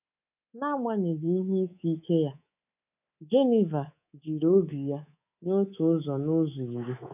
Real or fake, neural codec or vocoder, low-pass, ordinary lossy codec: fake; codec, 24 kHz, 3.1 kbps, DualCodec; 3.6 kHz; none